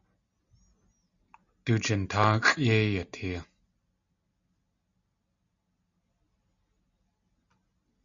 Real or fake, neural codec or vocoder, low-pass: real; none; 7.2 kHz